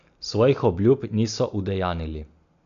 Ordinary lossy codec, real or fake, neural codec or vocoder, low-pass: none; real; none; 7.2 kHz